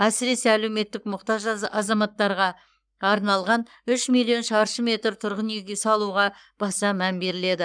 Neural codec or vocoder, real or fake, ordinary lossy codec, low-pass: codec, 44.1 kHz, 7.8 kbps, Pupu-Codec; fake; none; 9.9 kHz